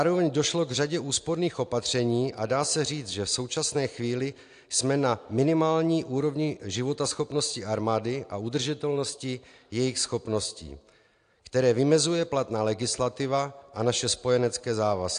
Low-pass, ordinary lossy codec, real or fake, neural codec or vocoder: 9.9 kHz; AAC, 64 kbps; real; none